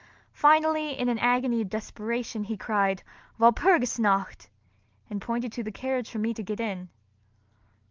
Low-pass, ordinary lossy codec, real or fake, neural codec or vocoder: 7.2 kHz; Opus, 32 kbps; real; none